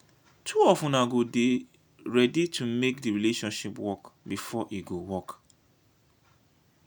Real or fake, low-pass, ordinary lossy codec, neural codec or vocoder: real; none; none; none